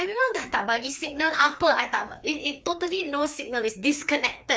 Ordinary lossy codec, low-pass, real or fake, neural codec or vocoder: none; none; fake; codec, 16 kHz, 2 kbps, FreqCodec, larger model